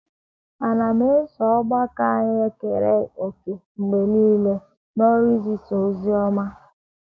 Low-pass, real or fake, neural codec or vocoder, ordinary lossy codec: none; fake; codec, 16 kHz, 6 kbps, DAC; none